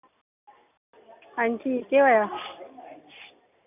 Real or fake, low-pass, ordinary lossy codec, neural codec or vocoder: real; 3.6 kHz; none; none